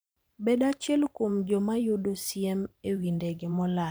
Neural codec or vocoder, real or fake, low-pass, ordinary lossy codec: vocoder, 44.1 kHz, 128 mel bands every 512 samples, BigVGAN v2; fake; none; none